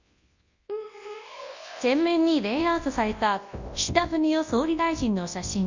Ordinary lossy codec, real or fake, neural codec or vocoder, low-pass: Opus, 64 kbps; fake; codec, 24 kHz, 0.9 kbps, WavTokenizer, large speech release; 7.2 kHz